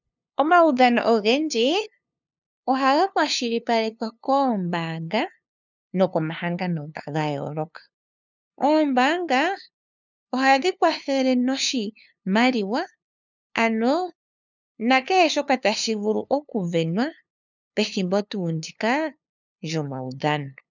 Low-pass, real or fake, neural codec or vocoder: 7.2 kHz; fake; codec, 16 kHz, 2 kbps, FunCodec, trained on LibriTTS, 25 frames a second